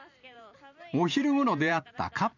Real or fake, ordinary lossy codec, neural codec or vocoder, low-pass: fake; none; vocoder, 44.1 kHz, 128 mel bands every 512 samples, BigVGAN v2; 7.2 kHz